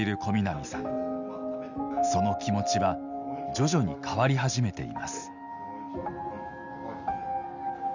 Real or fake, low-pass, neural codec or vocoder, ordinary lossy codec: real; 7.2 kHz; none; none